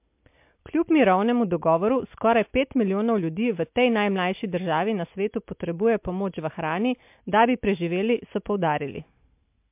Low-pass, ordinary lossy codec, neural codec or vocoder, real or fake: 3.6 kHz; MP3, 32 kbps; none; real